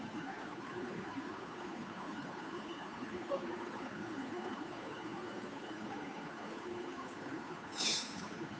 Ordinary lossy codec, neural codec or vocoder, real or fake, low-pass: none; codec, 16 kHz, 2 kbps, FunCodec, trained on Chinese and English, 25 frames a second; fake; none